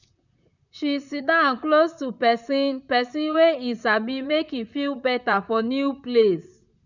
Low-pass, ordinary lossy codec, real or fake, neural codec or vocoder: 7.2 kHz; none; fake; vocoder, 44.1 kHz, 128 mel bands, Pupu-Vocoder